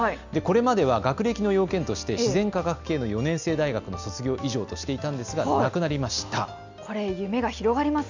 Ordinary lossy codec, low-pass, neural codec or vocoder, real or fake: none; 7.2 kHz; none; real